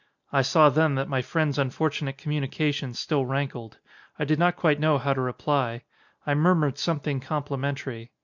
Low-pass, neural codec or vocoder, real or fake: 7.2 kHz; none; real